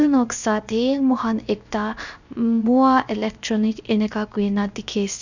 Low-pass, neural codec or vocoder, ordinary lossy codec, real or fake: 7.2 kHz; codec, 16 kHz, 0.7 kbps, FocalCodec; none; fake